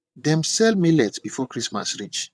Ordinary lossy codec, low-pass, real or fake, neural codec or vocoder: none; none; fake; vocoder, 22.05 kHz, 80 mel bands, WaveNeXt